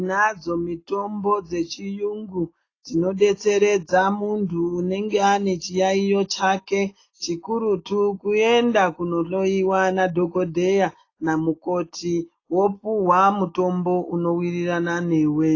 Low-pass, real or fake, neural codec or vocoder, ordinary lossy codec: 7.2 kHz; real; none; AAC, 32 kbps